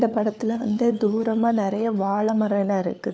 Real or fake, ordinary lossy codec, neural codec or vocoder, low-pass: fake; none; codec, 16 kHz, 4 kbps, FunCodec, trained on LibriTTS, 50 frames a second; none